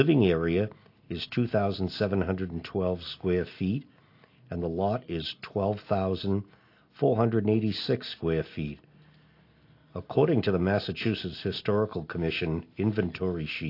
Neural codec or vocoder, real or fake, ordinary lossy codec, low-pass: none; real; AAC, 32 kbps; 5.4 kHz